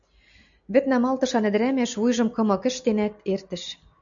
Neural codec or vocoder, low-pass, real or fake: none; 7.2 kHz; real